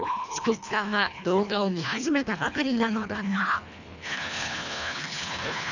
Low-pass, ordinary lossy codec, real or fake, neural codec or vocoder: 7.2 kHz; none; fake; codec, 24 kHz, 1.5 kbps, HILCodec